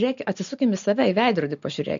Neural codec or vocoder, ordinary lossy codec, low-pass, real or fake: none; MP3, 64 kbps; 7.2 kHz; real